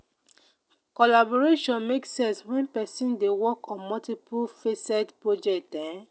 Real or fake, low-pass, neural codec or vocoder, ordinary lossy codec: real; none; none; none